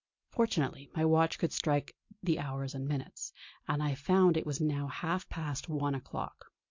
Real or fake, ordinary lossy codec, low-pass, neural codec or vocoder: real; MP3, 48 kbps; 7.2 kHz; none